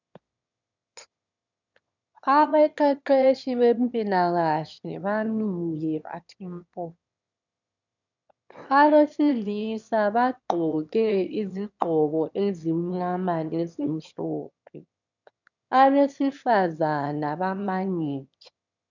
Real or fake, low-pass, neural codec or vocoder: fake; 7.2 kHz; autoencoder, 22.05 kHz, a latent of 192 numbers a frame, VITS, trained on one speaker